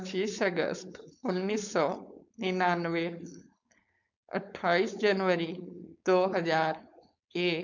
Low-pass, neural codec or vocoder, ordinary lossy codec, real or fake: 7.2 kHz; codec, 16 kHz, 4.8 kbps, FACodec; none; fake